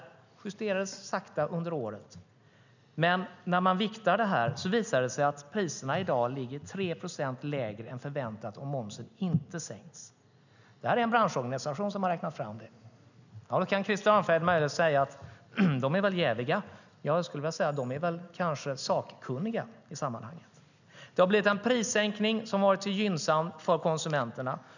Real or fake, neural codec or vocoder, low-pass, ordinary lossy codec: real; none; 7.2 kHz; none